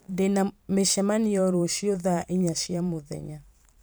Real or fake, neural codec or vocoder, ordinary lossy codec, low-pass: fake; vocoder, 44.1 kHz, 128 mel bands every 512 samples, BigVGAN v2; none; none